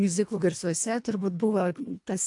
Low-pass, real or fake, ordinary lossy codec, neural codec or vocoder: 10.8 kHz; fake; AAC, 64 kbps; codec, 24 kHz, 1.5 kbps, HILCodec